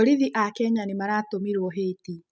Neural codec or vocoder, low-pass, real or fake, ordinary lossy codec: none; none; real; none